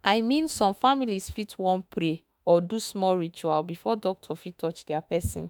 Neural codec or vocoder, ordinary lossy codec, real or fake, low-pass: autoencoder, 48 kHz, 32 numbers a frame, DAC-VAE, trained on Japanese speech; none; fake; none